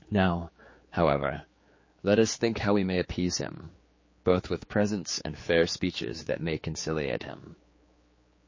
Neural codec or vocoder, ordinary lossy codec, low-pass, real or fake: codec, 16 kHz, 4 kbps, X-Codec, HuBERT features, trained on general audio; MP3, 32 kbps; 7.2 kHz; fake